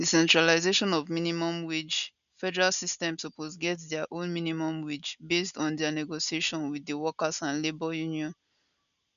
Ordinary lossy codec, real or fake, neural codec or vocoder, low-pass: none; real; none; 7.2 kHz